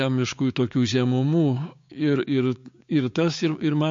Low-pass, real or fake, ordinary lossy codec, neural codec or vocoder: 7.2 kHz; fake; MP3, 48 kbps; codec, 16 kHz, 6 kbps, DAC